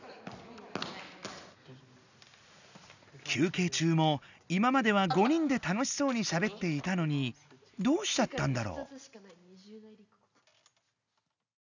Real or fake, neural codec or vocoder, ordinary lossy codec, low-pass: real; none; none; 7.2 kHz